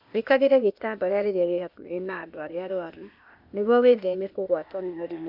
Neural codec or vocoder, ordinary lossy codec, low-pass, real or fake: codec, 16 kHz, 0.8 kbps, ZipCodec; none; 5.4 kHz; fake